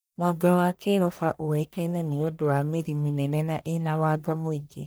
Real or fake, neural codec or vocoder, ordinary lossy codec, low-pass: fake; codec, 44.1 kHz, 1.7 kbps, Pupu-Codec; none; none